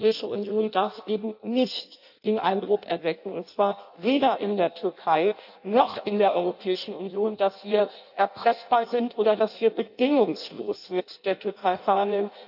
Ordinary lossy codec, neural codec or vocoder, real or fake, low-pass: AAC, 48 kbps; codec, 16 kHz in and 24 kHz out, 0.6 kbps, FireRedTTS-2 codec; fake; 5.4 kHz